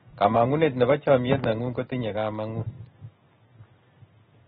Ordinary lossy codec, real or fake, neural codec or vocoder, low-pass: AAC, 16 kbps; real; none; 7.2 kHz